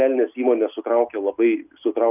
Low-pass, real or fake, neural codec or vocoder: 3.6 kHz; real; none